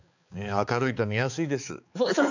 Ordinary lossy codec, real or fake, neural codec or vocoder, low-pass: none; fake; codec, 16 kHz, 4 kbps, X-Codec, HuBERT features, trained on balanced general audio; 7.2 kHz